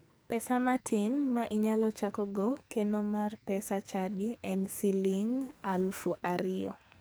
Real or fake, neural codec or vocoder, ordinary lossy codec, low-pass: fake; codec, 44.1 kHz, 2.6 kbps, SNAC; none; none